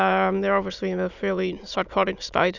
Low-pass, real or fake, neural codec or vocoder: 7.2 kHz; fake; autoencoder, 22.05 kHz, a latent of 192 numbers a frame, VITS, trained on many speakers